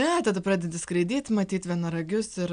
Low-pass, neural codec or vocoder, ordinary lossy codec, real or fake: 9.9 kHz; none; AAC, 96 kbps; real